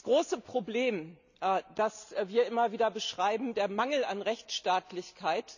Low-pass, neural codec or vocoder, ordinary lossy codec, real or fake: 7.2 kHz; none; none; real